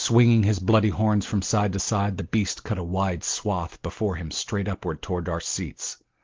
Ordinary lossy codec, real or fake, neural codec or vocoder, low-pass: Opus, 16 kbps; real; none; 7.2 kHz